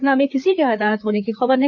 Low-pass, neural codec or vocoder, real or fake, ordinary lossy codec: 7.2 kHz; codec, 16 kHz, 4 kbps, FreqCodec, larger model; fake; none